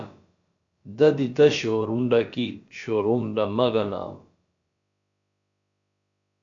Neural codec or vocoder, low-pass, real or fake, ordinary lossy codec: codec, 16 kHz, about 1 kbps, DyCAST, with the encoder's durations; 7.2 kHz; fake; AAC, 64 kbps